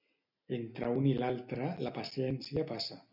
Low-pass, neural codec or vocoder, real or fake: 5.4 kHz; none; real